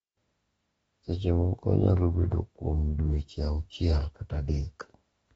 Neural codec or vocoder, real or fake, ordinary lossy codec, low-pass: autoencoder, 48 kHz, 32 numbers a frame, DAC-VAE, trained on Japanese speech; fake; AAC, 24 kbps; 19.8 kHz